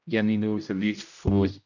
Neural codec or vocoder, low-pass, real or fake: codec, 16 kHz, 0.5 kbps, X-Codec, HuBERT features, trained on general audio; 7.2 kHz; fake